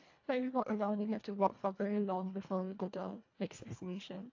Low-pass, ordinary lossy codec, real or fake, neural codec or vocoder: 7.2 kHz; AAC, 48 kbps; fake; codec, 24 kHz, 1.5 kbps, HILCodec